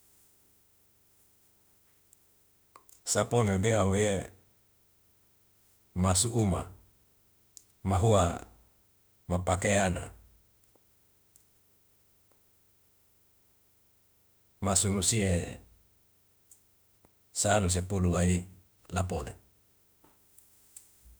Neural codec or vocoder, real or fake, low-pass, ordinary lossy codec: autoencoder, 48 kHz, 32 numbers a frame, DAC-VAE, trained on Japanese speech; fake; none; none